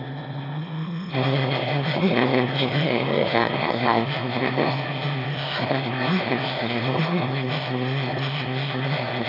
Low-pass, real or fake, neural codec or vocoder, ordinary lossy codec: 5.4 kHz; fake; autoencoder, 22.05 kHz, a latent of 192 numbers a frame, VITS, trained on one speaker; AAC, 24 kbps